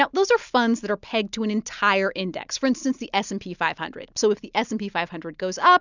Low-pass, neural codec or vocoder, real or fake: 7.2 kHz; none; real